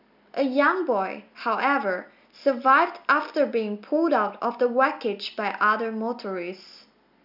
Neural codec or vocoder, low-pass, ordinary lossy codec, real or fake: none; 5.4 kHz; none; real